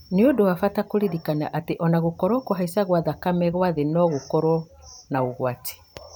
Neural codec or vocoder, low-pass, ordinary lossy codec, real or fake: vocoder, 44.1 kHz, 128 mel bands every 512 samples, BigVGAN v2; none; none; fake